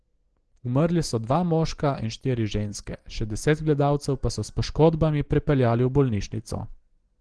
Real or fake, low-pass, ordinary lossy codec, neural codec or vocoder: real; 9.9 kHz; Opus, 16 kbps; none